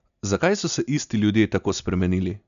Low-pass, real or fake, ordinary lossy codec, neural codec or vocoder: 7.2 kHz; real; none; none